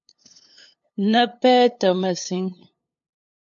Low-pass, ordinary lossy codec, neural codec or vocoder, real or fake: 7.2 kHz; MP3, 48 kbps; codec, 16 kHz, 8 kbps, FunCodec, trained on LibriTTS, 25 frames a second; fake